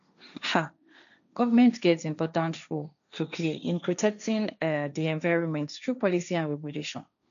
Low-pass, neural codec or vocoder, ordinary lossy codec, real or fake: 7.2 kHz; codec, 16 kHz, 1.1 kbps, Voila-Tokenizer; none; fake